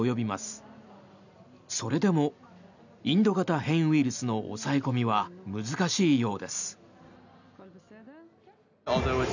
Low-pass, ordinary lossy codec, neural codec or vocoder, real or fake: 7.2 kHz; none; none; real